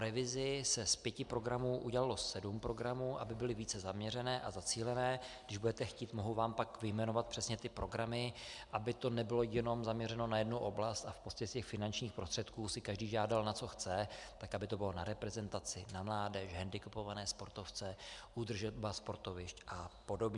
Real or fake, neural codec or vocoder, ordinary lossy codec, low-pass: real; none; MP3, 96 kbps; 10.8 kHz